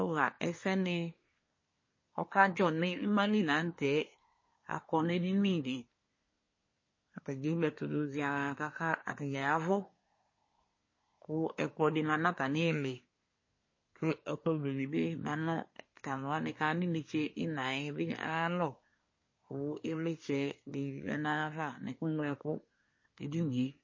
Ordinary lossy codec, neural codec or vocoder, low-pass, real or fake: MP3, 32 kbps; codec, 24 kHz, 1 kbps, SNAC; 7.2 kHz; fake